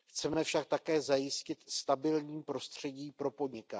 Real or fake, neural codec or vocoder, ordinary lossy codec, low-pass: real; none; none; none